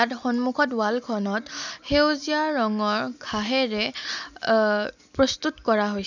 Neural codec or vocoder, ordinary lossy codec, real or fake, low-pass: none; none; real; 7.2 kHz